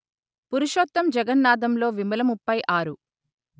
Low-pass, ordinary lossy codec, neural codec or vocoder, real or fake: none; none; none; real